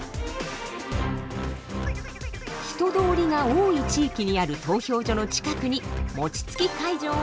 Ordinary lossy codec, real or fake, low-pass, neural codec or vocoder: none; real; none; none